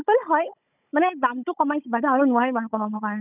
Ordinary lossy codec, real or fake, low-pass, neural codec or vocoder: none; fake; 3.6 kHz; codec, 16 kHz, 16 kbps, FunCodec, trained on Chinese and English, 50 frames a second